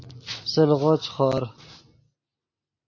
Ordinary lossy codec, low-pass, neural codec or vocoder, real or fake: MP3, 48 kbps; 7.2 kHz; none; real